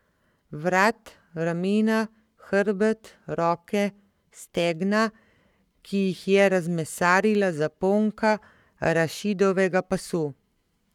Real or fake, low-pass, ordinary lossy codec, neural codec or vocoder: fake; 19.8 kHz; none; codec, 44.1 kHz, 7.8 kbps, Pupu-Codec